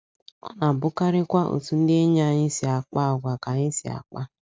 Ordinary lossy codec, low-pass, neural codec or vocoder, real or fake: none; none; none; real